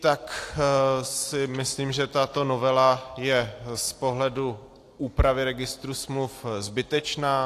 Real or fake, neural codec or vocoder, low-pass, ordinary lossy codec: real; none; 14.4 kHz; AAC, 64 kbps